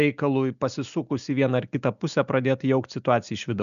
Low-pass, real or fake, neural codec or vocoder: 7.2 kHz; real; none